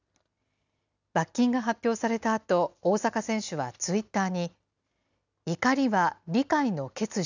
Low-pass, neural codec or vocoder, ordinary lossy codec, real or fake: 7.2 kHz; none; none; real